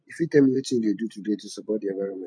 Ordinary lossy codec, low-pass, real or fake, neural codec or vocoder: MP3, 64 kbps; 9.9 kHz; fake; vocoder, 44.1 kHz, 128 mel bands every 512 samples, BigVGAN v2